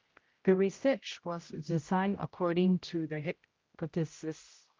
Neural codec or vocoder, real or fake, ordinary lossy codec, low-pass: codec, 16 kHz, 0.5 kbps, X-Codec, HuBERT features, trained on general audio; fake; Opus, 32 kbps; 7.2 kHz